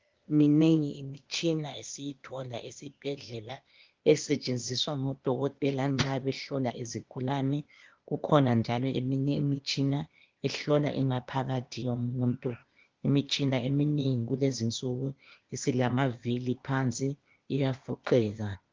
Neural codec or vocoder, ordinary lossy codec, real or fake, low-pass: codec, 16 kHz, 0.8 kbps, ZipCodec; Opus, 32 kbps; fake; 7.2 kHz